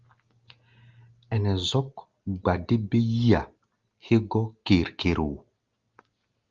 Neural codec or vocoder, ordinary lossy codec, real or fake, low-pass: none; Opus, 32 kbps; real; 7.2 kHz